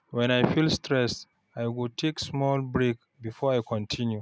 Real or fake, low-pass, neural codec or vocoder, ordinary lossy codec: real; none; none; none